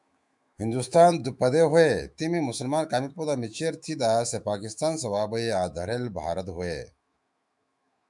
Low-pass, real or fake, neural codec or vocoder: 10.8 kHz; fake; autoencoder, 48 kHz, 128 numbers a frame, DAC-VAE, trained on Japanese speech